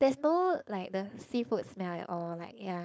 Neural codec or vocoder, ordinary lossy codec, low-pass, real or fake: codec, 16 kHz, 4.8 kbps, FACodec; none; none; fake